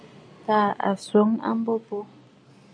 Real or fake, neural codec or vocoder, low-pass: real; none; 9.9 kHz